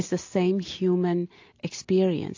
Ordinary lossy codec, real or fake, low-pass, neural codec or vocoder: AAC, 48 kbps; real; 7.2 kHz; none